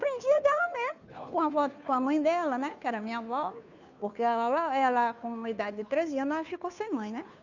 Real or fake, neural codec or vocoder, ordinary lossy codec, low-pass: fake; codec, 16 kHz, 2 kbps, FunCodec, trained on Chinese and English, 25 frames a second; none; 7.2 kHz